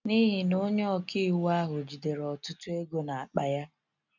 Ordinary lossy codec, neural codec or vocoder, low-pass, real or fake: none; none; 7.2 kHz; real